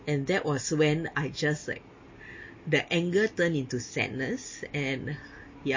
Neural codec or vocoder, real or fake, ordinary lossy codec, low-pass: none; real; MP3, 32 kbps; 7.2 kHz